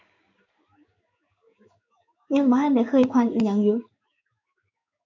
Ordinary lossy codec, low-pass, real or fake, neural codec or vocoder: none; 7.2 kHz; fake; codec, 16 kHz in and 24 kHz out, 1 kbps, XY-Tokenizer